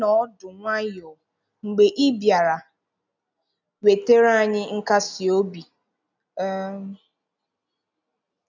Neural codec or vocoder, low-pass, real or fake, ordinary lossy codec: none; 7.2 kHz; real; none